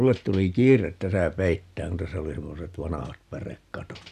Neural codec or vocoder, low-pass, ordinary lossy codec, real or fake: none; 14.4 kHz; none; real